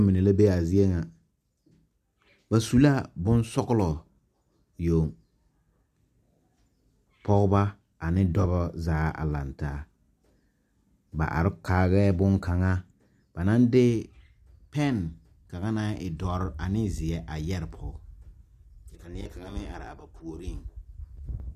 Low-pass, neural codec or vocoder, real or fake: 14.4 kHz; none; real